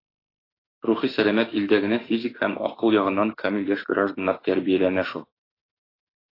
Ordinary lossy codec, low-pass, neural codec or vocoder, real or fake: AAC, 24 kbps; 5.4 kHz; autoencoder, 48 kHz, 32 numbers a frame, DAC-VAE, trained on Japanese speech; fake